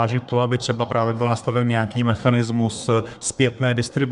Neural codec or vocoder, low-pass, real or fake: codec, 24 kHz, 1 kbps, SNAC; 10.8 kHz; fake